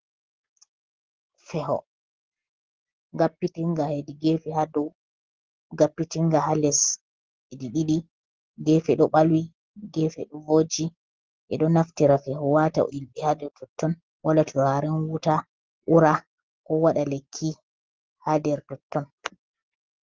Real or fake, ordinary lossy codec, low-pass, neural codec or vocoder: real; Opus, 16 kbps; 7.2 kHz; none